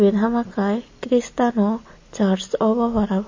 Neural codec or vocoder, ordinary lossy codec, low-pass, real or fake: none; MP3, 32 kbps; 7.2 kHz; real